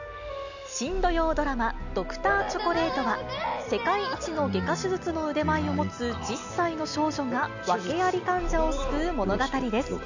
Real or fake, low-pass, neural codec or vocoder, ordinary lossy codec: real; 7.2 kHz; none; none